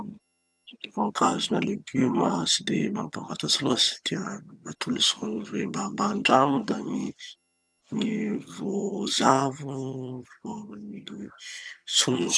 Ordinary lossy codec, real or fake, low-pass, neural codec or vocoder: none; fake; none; vocoder, 22.05 kHz, 80 mel bands, HiFi-GAN